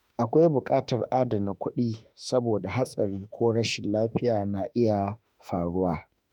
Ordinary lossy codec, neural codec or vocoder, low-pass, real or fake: none; autoencoder, 48 kHz, 32 numbers a frame, DAC-VAE, trained on Japanese speech; 19.8 kHz; fake